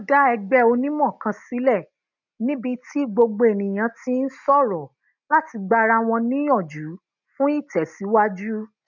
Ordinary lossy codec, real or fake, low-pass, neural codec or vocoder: none; real; 7.2 kHz; none